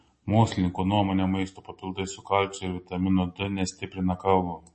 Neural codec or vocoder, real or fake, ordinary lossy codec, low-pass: none; real; MP3, 32 kbps; 10.8 kHz